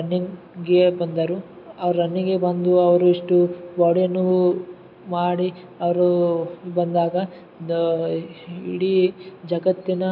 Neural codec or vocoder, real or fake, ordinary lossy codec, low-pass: none; real; none; 5.4 kHz